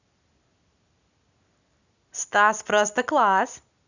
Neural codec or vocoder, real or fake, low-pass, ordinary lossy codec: none; real; 7.2 kHz; none